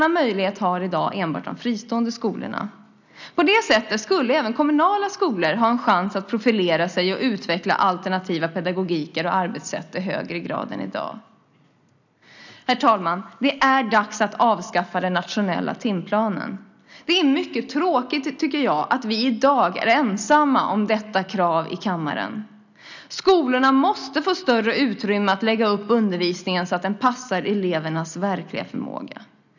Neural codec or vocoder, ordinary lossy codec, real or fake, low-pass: none; none; real; 7.2 kHz